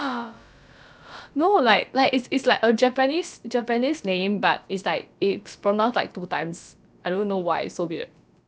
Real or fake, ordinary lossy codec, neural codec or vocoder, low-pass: fake; none; codec, 16 kHz, about 1 kbps, DyCAST, with the encoder's durations; none